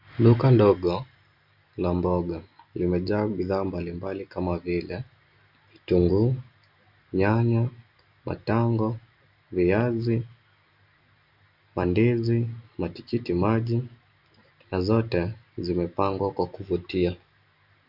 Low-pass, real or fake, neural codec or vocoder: 5.4 kHz; real; none